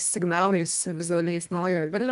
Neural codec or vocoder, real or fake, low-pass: codec, 24 kHz, 1.5 kbps, HILCodec; fake; 10.8 kHz